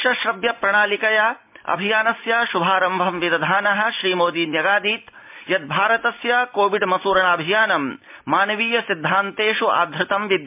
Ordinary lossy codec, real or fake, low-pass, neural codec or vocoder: MP3, 32 kbps; real; 3.6 kHz; none